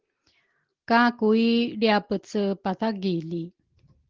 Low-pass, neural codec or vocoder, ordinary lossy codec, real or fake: 7.2 kHz; none; Opus, 16 kbps; real